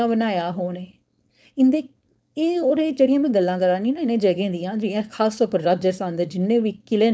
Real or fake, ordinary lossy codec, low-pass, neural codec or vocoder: fake; none; none; codec, 16 kHz, 4.8 kbps, FACodec